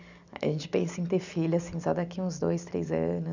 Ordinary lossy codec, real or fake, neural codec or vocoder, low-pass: none; real; none; 7.2 kHz